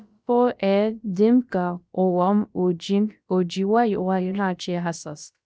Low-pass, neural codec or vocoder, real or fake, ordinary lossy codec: none; codec, 16 kHz, about 1 kbps, DyCAST, with the encoder's durations; fake; none